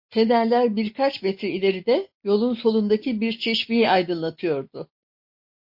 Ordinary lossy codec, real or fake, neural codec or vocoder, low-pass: MP3, 32 kbps; real; none; 5.4 kHz